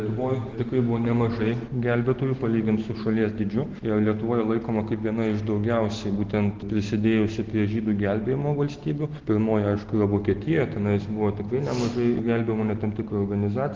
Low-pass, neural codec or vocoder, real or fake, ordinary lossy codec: 7.2 kHz; none; real; Opus, 16 kbps